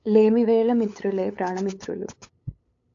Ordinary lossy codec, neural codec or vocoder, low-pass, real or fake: AAC, 48 kbps; codec, 16 kHz, 8 kbps, FunCodec, trained on LibriTTS, 25 frames a second; 7.2 kHz; fake